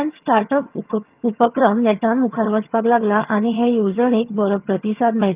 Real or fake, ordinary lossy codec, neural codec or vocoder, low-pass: fake; Opus, 32 kbps; vocoder, 22.05 kHz, 80 mel bands, HiFi-GAN; 3.6 kHz